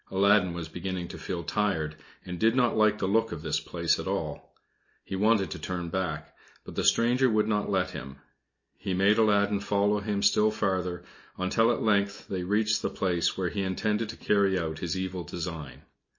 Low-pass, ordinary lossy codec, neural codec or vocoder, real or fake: 7.2 kHz; MP3, 32 kbps; none; real